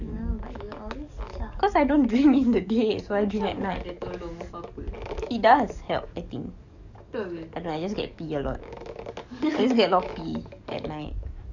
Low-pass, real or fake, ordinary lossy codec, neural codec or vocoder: 7.2 kHz; fake; none; codec, 44.1 kHz, 7.8 kbps, DAC